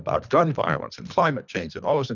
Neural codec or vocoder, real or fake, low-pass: codec, 24 kHz, 3 kbps, HILCodec; fake; 7.2 kHz